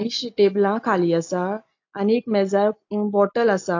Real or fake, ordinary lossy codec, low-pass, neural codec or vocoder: real; AAC, 48 kbps; 7.2 kHz; none